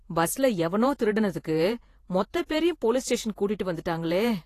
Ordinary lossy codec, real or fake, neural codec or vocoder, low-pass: AAC, 48 kbps; fake; vocoder, 48 kHz, 128 mel bands, Vocos; 14.4 kHz